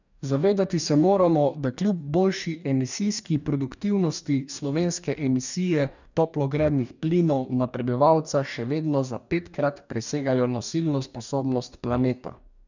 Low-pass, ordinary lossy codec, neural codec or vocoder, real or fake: 7.2 kHz; none; codec, 44.1 kHz, 2.6 kbps, DAC; fake